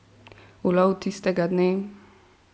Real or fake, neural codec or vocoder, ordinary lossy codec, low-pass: real; none; none; none